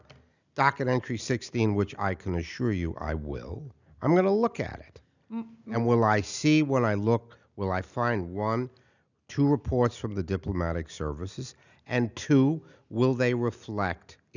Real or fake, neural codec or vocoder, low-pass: real; none; 7.2 kHz